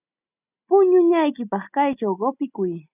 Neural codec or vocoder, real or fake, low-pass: none; real; 3.6 kHz